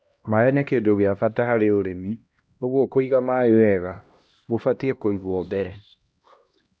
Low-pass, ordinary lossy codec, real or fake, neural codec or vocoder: none; none; fake; codec, 16 kHz, 1 kbps, X-Codec, HuBERT features, trained on LibriSpeech